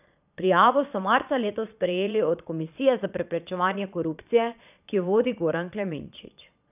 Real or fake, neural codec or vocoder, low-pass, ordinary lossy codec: fake; vocoder, 22.05 kHz, 80 mel bands, Vocos; 3.6 kHz; none